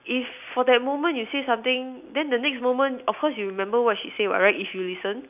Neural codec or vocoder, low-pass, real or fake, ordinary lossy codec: none; 3.6 kHz; real; none